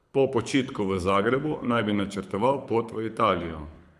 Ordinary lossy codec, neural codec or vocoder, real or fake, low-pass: none; codec, 24 kHz, 6 kbps, HILCodec; fake; none